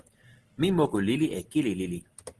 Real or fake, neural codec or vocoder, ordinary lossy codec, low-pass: real; none; Opus, 16 kbps; 10.8 kHz